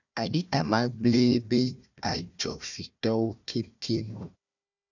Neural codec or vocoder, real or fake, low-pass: codec, 16 kHz, 1 kbps, FunCodec, trained on Chinese and English, 50 frames a second; fake; 7.2 kHz